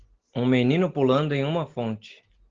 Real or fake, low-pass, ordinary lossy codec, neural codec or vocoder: real; 7.2 kHz; Opus, 16 kbps; none